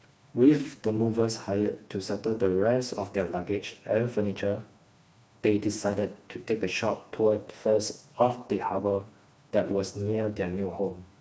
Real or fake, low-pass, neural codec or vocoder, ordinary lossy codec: fake; none; codec, 16 kHz, 2 kbps, FreqCodec, smaller model; none